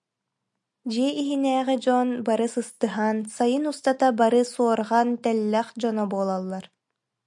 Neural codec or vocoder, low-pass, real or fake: none; 10.8 kHz; real